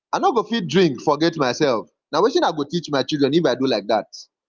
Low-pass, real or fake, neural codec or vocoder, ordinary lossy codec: 7.2 kHz; real; none; Opus, 24 kbps